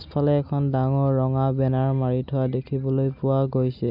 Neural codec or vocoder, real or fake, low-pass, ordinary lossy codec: none; real; 5.4 kHz; none